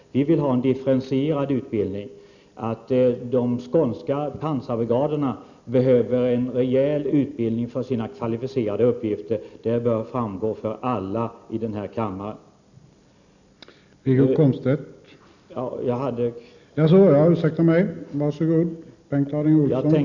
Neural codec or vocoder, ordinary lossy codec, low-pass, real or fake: none; none; 7.2 kHz; real